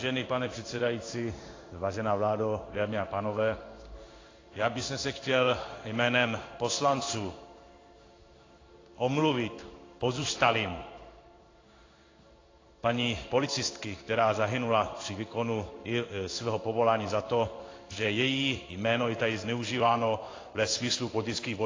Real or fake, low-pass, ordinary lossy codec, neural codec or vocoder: fake; 7.2 kHz; AAC, 32 kbps; codec, 16 kHz in and 24 kHz out, 1 kbps, XY-Tokenizer